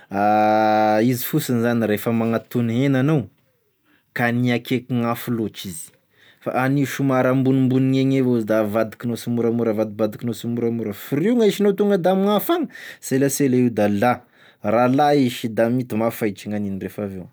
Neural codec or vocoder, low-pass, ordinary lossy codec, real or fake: none; none; none; real